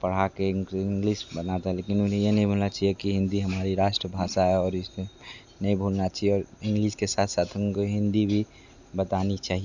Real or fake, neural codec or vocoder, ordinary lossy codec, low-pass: real; none; none; 7.2 kHz